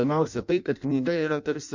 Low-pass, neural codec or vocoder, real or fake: 7.2 kHz; codec, 16 kHz in and 24 kHz out, 0.6 kbps, FireRedTTS-2 codec; fake